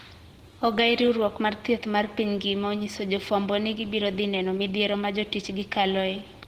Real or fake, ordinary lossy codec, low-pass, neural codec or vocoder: real; Opus, 16 kbps; 14.4 kHz; none